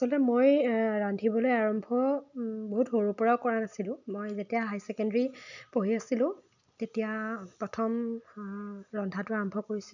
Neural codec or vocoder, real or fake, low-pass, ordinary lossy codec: none; real; 7.2 kHz; none